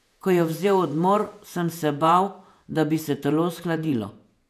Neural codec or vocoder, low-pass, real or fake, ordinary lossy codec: vocoder, 48 kHz, 128 mel bands, Vocos; 14.4 kHz; fake; none